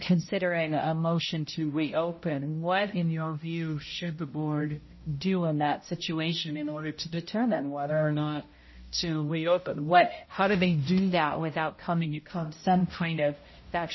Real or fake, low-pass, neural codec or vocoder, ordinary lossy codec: fake; 7.2 kHz; codec, 16 kHz, 0.5 kbps, X-Codec, HuBERT features, trained on balanced general audio; MP3, 24 kbps